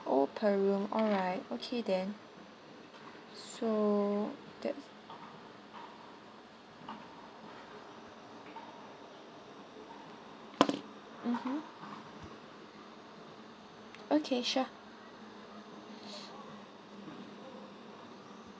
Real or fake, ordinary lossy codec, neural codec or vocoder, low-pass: real; none; none; none